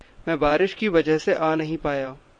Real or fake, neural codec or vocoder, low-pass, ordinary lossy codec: fake; vocoder, 22.05 kHz, 80 mel bands, WaveNeXt; 9.9 kHz; MP3, 48 kbps